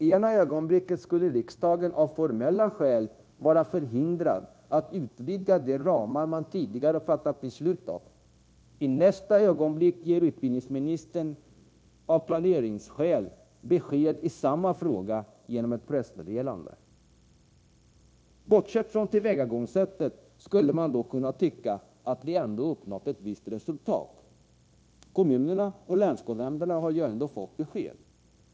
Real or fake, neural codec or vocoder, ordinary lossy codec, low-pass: fake; codec, 16 kHz, 0.9 kbps, LongCat-Audio-Codec; none; none